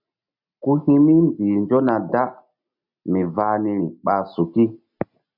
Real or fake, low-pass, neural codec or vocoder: real; 5.4 kHz; none